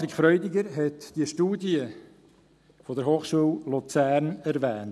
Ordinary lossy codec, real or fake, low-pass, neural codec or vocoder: none; real; none; none